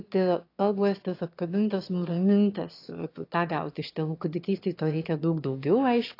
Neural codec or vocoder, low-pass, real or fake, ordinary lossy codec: autoencoder, 22.05 kHz, a latent of 192 numbers a frame, VITS, trained on one speaker; 5.4 kHz; fake; AAC, 32 kbps